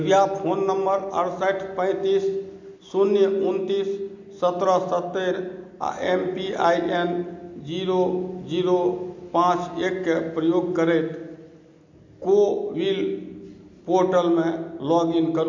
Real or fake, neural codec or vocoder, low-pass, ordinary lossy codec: real; none; 7.2 kHz; MP3, 48 kbps